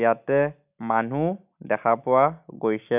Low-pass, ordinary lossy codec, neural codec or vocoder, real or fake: 3.6 kHz; none; none; real